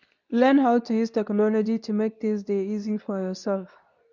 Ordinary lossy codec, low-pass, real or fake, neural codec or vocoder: none; 7.2 kHz; fake; codec, 24 kHz, 0.9 kbps, WavTokenizer, medium speech release version 2